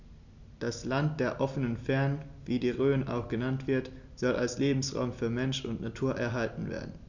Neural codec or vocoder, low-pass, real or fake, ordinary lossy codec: none; 7.2 kHz; real; none